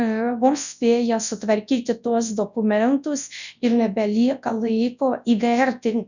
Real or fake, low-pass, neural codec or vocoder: fake; 7.2 kHz; codec, 24 kHz, 0.9 kbps, WavTokenizer, large speech release